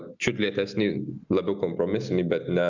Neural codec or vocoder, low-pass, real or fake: none; 7.2 kHz; real